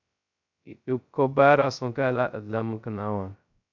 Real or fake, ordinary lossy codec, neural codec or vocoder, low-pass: fake; MP3, 64 kbps; codec, 16 kHz, 0.2 kbps, FocalCodec; 7.2 kHz